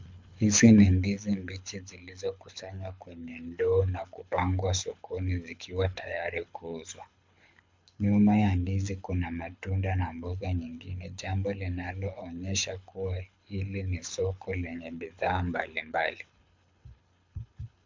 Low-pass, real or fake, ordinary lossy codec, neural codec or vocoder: 7.2 kHz; fake; MP3, 64 kbps; codec, 24 kHz, 6 kbps, HILCodec